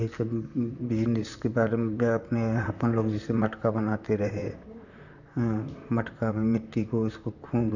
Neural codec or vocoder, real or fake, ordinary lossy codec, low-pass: vocoder, 44.1 kHz, 128 mel bands, Pupu-Vocoder; fake; none; 7.2 kHz